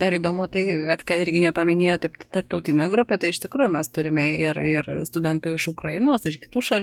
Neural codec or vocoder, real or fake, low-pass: codec, 44.1 kHz, 2.6 kbps, DAC; fake; 19.8 kHz